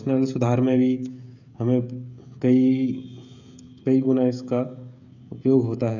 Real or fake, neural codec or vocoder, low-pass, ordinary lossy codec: fake; codec, 16 kHz, 16 kbps, FreqCodec, smaller model; 7.2 kHz; none